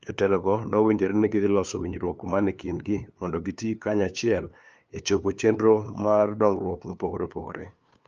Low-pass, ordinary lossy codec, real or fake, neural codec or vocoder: 7.2 kHz; Opus, 24 kbps; fake; codec, 16 kHz, 2 kbps, FunCodec, trained on LibriTTS, 25 frames a second